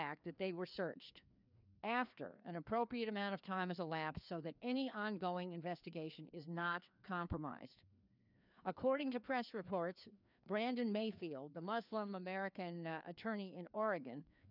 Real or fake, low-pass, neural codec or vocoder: fake; 5.4 kHz; codec, 16 kHz, 2 kbps, FreqCodec, larger model